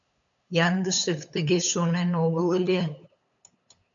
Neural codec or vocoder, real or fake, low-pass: codec, 16 kHz, 8 kbps, FunCodec, trained on LibriTTS, 25 frames a second; fake; 7.2 kHz